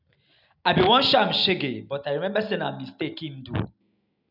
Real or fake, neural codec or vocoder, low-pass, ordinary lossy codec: real; none; 5.4 kHz; none